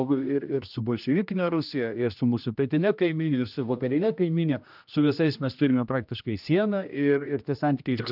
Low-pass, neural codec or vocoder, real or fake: 5.4 kHz; codec, 16 kHz, 1 kbps, X-Codec, HuBERT features, trained on general audio; fake